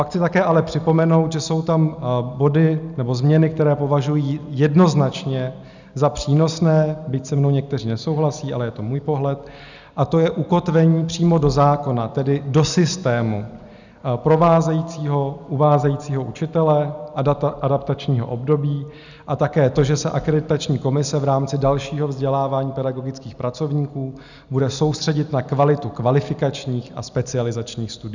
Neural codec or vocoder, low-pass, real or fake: none; 7.2 kHz; real